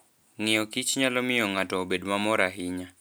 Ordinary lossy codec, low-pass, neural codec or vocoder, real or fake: none; none; none; real